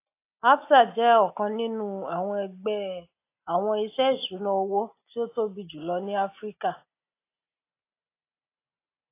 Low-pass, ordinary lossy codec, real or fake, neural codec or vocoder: 3.6 kHz; AAC, 24 kbps; real; none